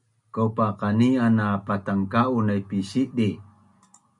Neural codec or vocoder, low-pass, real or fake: none; 10.8 kHz; real